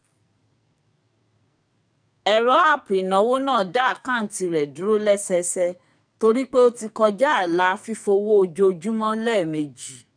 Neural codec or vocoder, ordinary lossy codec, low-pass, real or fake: codec, 44.1 kHz, 2.6 kbps, SNAC; none; 9.9 kHz; fake